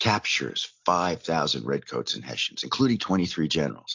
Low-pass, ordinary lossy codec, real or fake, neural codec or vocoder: 7.2 kHz; AAC, 48 kbps; real; none